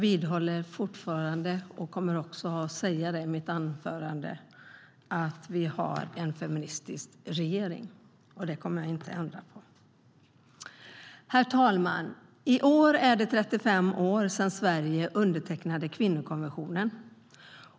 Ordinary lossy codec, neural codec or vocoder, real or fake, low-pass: none; none; real; none